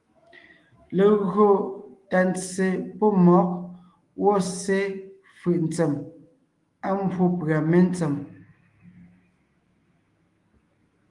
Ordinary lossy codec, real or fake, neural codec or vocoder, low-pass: Opus, 24 kbps; fake; autoencoder, 48 kHz, 128 numbers a frame, DAC-VAE, trained on Japanese speech; 10.8 kHz